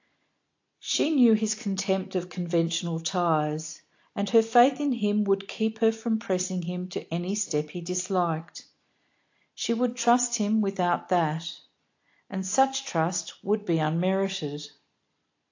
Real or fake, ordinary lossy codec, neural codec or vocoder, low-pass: real; AAC, 48 kbps; none; 7.2 kHz